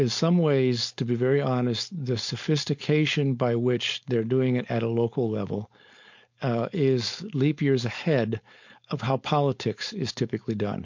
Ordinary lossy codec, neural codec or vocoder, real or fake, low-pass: MP3, 48 kbps; codec, 16 kHz, 4.8 kbps, FACodec; fake; 7.2 kHz